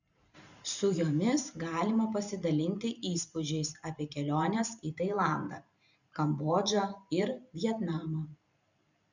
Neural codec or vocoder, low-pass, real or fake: vocoder, 44.1 kHz, 128 mel bands every 512 samples, BigVGAN v2; 7.2 kHz; fake